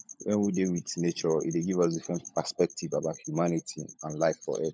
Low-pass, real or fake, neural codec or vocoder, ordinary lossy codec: none; fake; codec, 16 kHz, 16 kbps, FunCodec, trained on LibriTTS, 50 frames a second; none